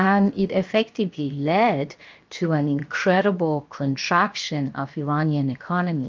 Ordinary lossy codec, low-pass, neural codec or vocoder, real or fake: Opus, 24 kbps; 7.2 kHz; codec, 16 kHz, 0.7 kbps, FocalCodec; fake